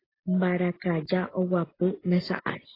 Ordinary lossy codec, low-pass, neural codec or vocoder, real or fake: AAC, 24 kbps; 5.4 kHz; none; real